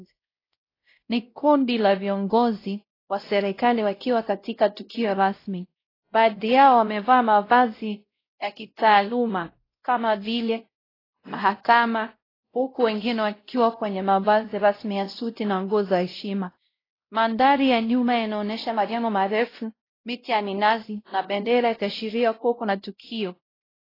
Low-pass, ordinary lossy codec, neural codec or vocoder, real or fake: 5.4 kHz; AAC, 24 kbps; codec, 16 kHz, 0.5 kbps, X-Codec, WavLM features, trained on Multilingual LibriSpeech; fake